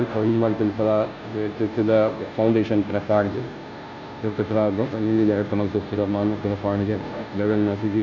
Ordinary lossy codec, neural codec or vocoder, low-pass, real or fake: MP3, 64 kbps; codec, 16 kHz, 0.5 kbps, FunCodec, trained on Chinese and English, 25 frames a second; 7.2 kHz; fake